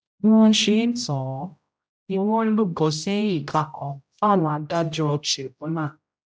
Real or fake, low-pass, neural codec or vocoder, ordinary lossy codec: fake; none; codec, 16 kHz, 0.5 kbps, X-Codec, HuBERT features, trained on general audio; none